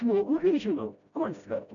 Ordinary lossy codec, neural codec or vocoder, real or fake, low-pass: MP3, 96 kbps; codec, 16 kHz, 0.5 kbps, FreqCodec, smaller model; fake; 7.2 kHz